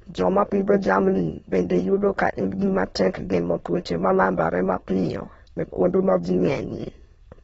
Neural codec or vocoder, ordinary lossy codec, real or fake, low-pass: autoencoder, 22.05 kHz, a latent of 192 numbers a frame, VITS, trained on many speakers; AAC, 24 kbps; fake; 9.9 kHz